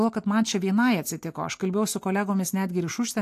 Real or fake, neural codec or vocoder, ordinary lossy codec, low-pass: real; none; AAC, 64 kbps; 14.4 kHz